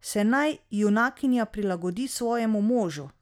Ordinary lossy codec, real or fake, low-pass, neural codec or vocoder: none; real; 19.8 kHz; none